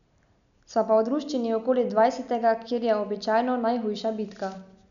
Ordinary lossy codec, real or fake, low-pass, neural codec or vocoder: none; real; 7.2 kHz; none